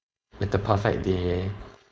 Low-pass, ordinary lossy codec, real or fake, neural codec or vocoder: none; none; fake; codec, 16 kHz, 4.8 kbps, FACodec